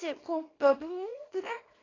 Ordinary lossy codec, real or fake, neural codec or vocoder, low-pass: AAC, 32 kbps; fake; codec, 24 kHz, 0.9 kbps, WavTokenizer, small release; 7.2 kHz